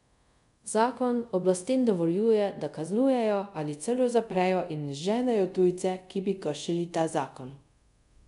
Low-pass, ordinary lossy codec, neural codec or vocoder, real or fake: 10.8 kHz; none; codec, 24 kHz, 0.5 kbps, DualCodec; fake